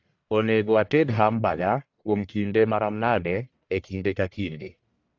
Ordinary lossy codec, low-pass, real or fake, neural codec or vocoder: AAC, 48 kbps; 7.2 kHz; fake; codec, 44.1 kHz, 1.7 kbps, Pupu-Codec